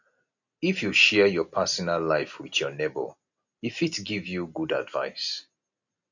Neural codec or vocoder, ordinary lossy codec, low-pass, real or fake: none; none; 7.2 kHz; real